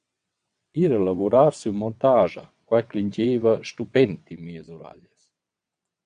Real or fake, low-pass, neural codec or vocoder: fake; 9.9 kHz; vocoder, 22.05 kHz, 80 mel bands, WaveNeXt